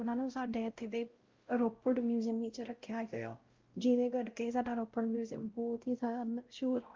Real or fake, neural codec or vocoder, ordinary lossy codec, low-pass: fake; codec, 16 kHz, 0.5 kbps, X-Codec, WavLM features, trained on Multilingual LibriSpeech; Opus, 32 kbps; 7.2 kHz